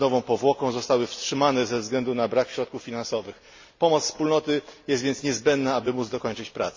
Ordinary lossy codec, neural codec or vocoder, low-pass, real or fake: MP3, 32 kbps; none; 7.2 kHz; real